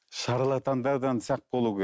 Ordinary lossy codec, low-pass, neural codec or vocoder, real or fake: none; none; none; real